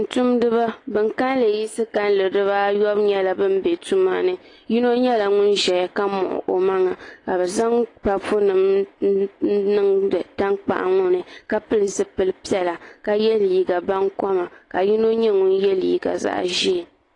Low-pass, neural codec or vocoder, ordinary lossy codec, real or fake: 10.8 kHz; none; AAC, 32 kbps; real